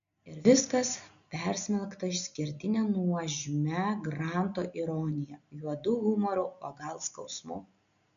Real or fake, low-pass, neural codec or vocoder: real; 7.2 kHz; none